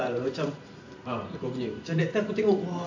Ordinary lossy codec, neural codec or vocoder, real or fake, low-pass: AAC, 48 kbps; vocoder, 44.1 kHz, 128 mel bands every 512 samples, BigVGAN v2; fake; 7.2 kHz